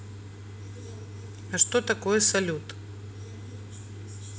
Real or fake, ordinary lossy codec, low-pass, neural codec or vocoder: real; none; none; none